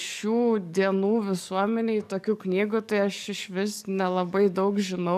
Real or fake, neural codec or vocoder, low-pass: fake; codec, 44.1 kHz, 7.8 kbps, DAC; 14.4 kHz